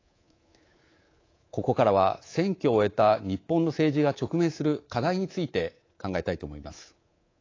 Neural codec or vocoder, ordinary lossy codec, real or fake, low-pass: codec, 24 kHz, 3.1 kbps, DualCodec; AAC, 32 kbps; fake; 7.2 kHz